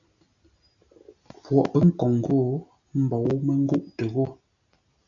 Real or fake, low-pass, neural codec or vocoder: real; 7.2 kHz; none